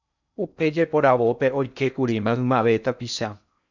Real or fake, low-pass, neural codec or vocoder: fake; 7.2 kHz; codec, 16 kHz in and 24 kHz out, 0.6 kbps, FocalCodec, streaming, 4096 codes